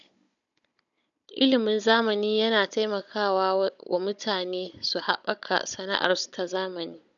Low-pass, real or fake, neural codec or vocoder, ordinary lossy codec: 7.2 kHz; fake; codec, 16 kHz, 16 kbps, FunCodec, trained on Chinese and English, 50 frames a second; none